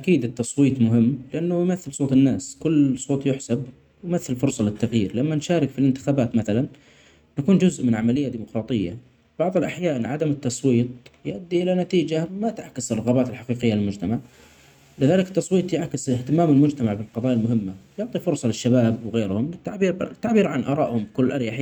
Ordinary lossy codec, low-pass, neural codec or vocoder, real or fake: none; 19.8 kHz; none; real